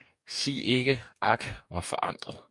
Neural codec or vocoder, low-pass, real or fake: codec, 44.1 kHz, 2.6 kbps, DAC; 10.8 kHz; fake